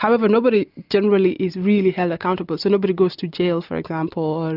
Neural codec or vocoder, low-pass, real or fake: none; 5.4 kHz; real